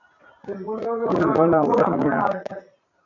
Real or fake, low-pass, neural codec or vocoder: fake; 7.2 kHz; vocoder, 44.1 kHz, 80 mel bands, Vocos